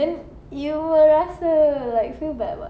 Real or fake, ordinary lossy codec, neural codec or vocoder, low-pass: real; none; none; none